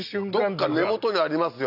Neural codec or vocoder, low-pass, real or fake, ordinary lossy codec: none; 5.4 kHz; real; none